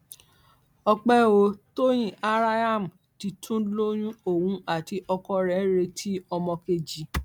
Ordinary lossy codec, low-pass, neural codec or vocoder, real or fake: none; 19.8 kHz; none; real